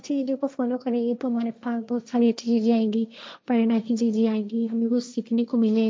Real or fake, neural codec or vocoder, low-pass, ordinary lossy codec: fake; codec, 16 kHz, 1.1 kbps, Voila-Tokenizer; none; none